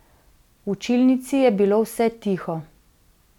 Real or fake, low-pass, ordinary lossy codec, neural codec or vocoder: real; 19.8 kHz; none; none